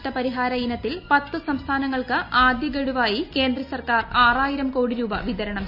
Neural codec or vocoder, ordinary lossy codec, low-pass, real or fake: none; none; 5.4 kHz; real